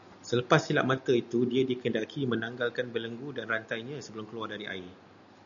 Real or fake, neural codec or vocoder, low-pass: real; none; 7.2 kHz